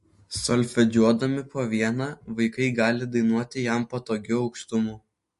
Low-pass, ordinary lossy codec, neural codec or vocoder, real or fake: 14.4 kHz; MP3, 48 kbps; none; real